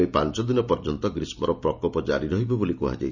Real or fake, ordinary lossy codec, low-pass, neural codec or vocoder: real; none; 7.2 kHz; none